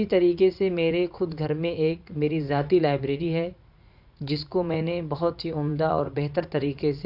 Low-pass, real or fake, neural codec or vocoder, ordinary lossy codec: 5.4 kHz; fake; vocoder, 44.1 kHz, 80 mel bands, Vocos; none